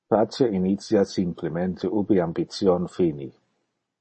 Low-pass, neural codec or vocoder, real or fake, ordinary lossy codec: 10.8 kHz; none; real; MP3, 32 kbps